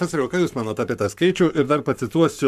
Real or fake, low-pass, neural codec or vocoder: fake; 14.4 kHz; codec, 44.1 kHz, 7.8 kbps, Pupu-Codec